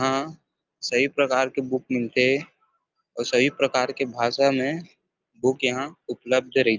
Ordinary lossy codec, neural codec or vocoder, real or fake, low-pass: Opus, 24 kbps; none; real; 7.2 kHz